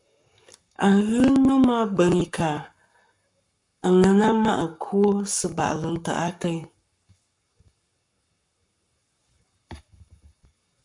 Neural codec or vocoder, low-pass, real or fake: codec, 44.1 kHz, 7.8 kbps, Pupu-Codec; 10.8 kHz; fake